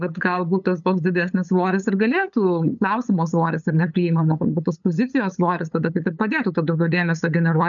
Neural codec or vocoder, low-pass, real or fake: codec, 16 kHz, 8 kbps, FunCodec, trained on LibriTTS, 25 frames a second; 7.2 kHz; fake